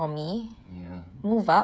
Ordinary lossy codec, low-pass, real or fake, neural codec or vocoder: none; none; fake; codec, 16 kHz, 16 kbps, FreqCodec, smaller model